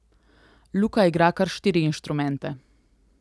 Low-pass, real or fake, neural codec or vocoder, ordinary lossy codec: none; real; none; none